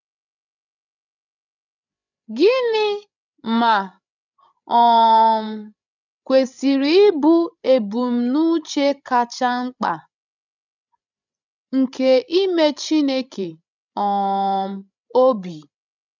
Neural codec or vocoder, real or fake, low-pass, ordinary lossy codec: codec, 16 kHz, 16 kbps, FreqCodec, larger model; fake; 7.2 kHz; none